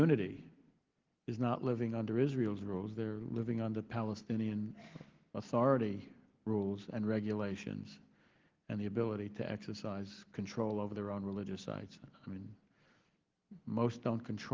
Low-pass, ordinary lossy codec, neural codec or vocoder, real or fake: 7.2 kHz; Opus, 16 kbps; none; real